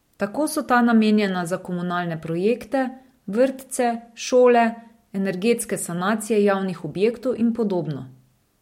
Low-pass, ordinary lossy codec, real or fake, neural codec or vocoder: 19.8 kHz; MP3, 64 kbps; real; none